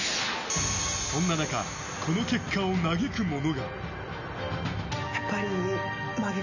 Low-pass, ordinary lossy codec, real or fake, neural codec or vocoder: 7.2 kHz; none; real; none